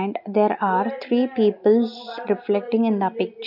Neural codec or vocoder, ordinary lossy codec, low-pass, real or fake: none; none; 5.4 kHz; real